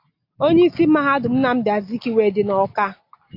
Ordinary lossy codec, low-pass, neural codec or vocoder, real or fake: AAC, 32 kbps; 5.4 kHz; none; real